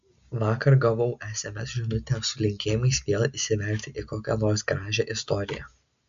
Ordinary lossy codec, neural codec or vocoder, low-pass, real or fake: MP3, 64 kbps; none; 7.2 kHz; real